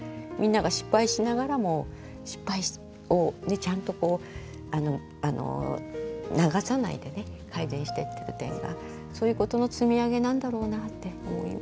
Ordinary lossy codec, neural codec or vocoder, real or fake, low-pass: none; none; real; none